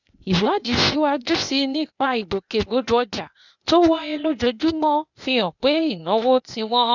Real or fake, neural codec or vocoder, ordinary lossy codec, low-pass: fake; codec, 16 kHz, 0.8 kbps, ZipCodec; none; 7.2 kHz